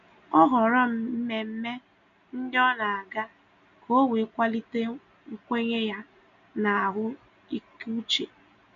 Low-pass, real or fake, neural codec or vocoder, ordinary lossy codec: 7.2 kHz; real; none; none